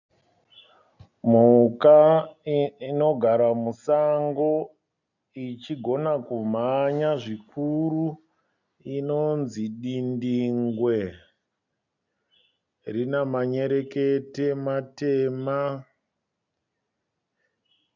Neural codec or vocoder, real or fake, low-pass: none; real; 7.2 kHz